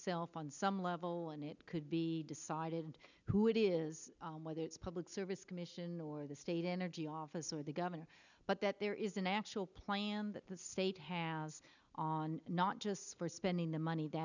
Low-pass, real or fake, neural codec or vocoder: 7.2 kHz; real; none